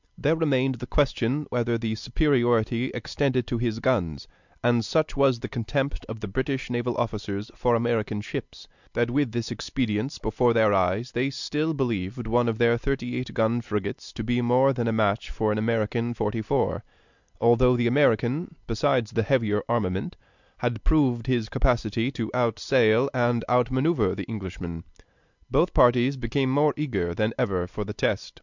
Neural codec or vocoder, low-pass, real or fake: none; 7.2 kHz; real